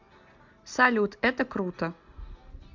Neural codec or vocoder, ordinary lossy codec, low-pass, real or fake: none; MP3, 64 kbps; 7.2 kHz; real